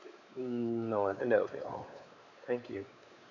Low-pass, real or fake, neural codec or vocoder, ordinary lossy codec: 7.2 kHz; fake; codec, 16 kHz, 4 kbps, X-Codec, WavLM features, trained on Multilingual LibriSpeech; none